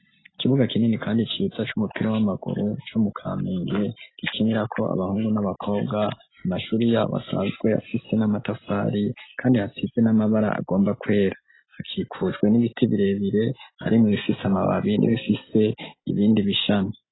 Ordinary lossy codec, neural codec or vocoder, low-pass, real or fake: AAC, 16 kbps; none; 7.2 kHz; real